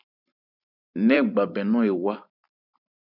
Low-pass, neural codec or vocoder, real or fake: 5.4 kHz; vocoder, 44.1 kHz, 128 mel bands every 512 samples, BigVGAN v2; fake